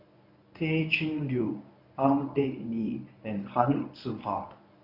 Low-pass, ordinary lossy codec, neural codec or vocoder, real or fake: 5.4 kHz; none; codec, 24 kHz, 0.9 kbps, WavTokenizer, medium speech release version 1; fake